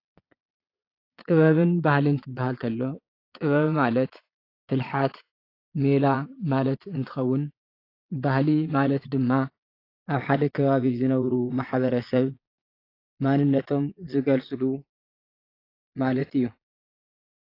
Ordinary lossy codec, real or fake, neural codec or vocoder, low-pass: AAC, 32 kbps; fake; vocoder, 22.05 kHz, 80 mel bands, Vocos; 5.4 kHz